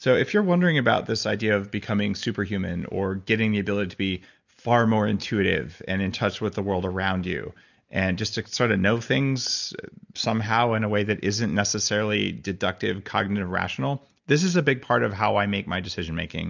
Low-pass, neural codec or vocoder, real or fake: 7.2 kHz; none; real